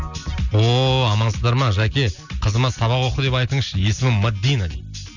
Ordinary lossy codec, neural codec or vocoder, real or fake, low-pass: none; none; real; 7.2 kHz